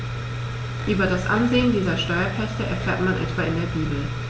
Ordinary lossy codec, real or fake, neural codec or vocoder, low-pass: none; real; none; none